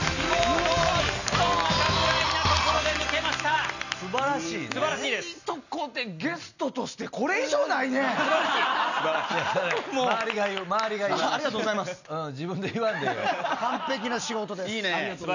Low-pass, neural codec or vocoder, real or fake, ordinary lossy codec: 7.2 kHz; none; real; none